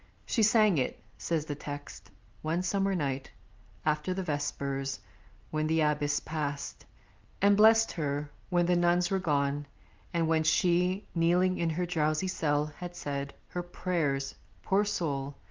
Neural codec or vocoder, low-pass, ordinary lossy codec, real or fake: none; 7.2 kHz; Opus, 32 kbps; real